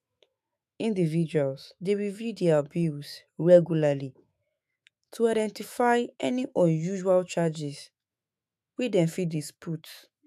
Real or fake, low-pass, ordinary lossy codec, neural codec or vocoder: fake; 14.4 kHz; AAC, 96 kbps; autoencoder, 48 kHz, 128 numbers a frame, DAC-VAE, trained on Japanese speech